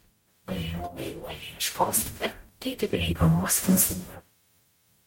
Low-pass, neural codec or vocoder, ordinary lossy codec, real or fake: 19.8 kHz; codec, 44.1 kHz, 0.9 kbps, DAC; MP3, 64 kbps; fake